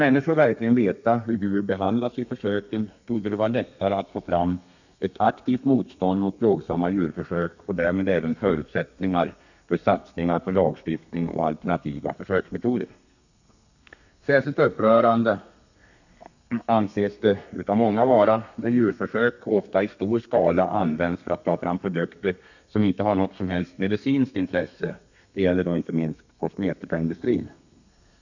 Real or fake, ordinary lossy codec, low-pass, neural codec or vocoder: fake; none; 7.2 kHz; codec, 32 kHz, 1.9 kbps, SNAC